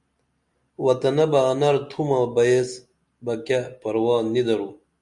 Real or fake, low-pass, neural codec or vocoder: real; 10.8 kHz; none